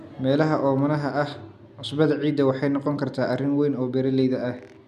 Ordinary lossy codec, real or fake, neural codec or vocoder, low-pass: none; real; none; 14.4 kHz